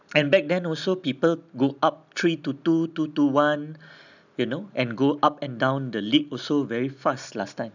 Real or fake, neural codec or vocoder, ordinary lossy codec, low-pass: real; none; none; 7.2 kHz